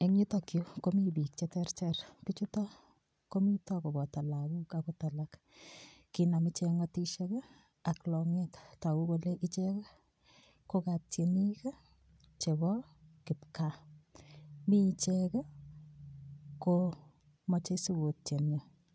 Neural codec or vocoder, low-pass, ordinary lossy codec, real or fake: none; none; none; real